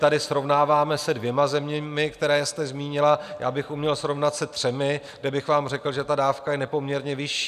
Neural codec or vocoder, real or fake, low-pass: none; real; 14.4 kHz